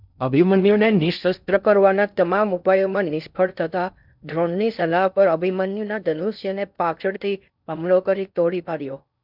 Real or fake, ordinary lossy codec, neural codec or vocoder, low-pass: fake; none; codec, 16 kHz in and 24 kHz out, 0.6 kbps, FocalCodec, streaming, 2048 codes; 5.4 kHz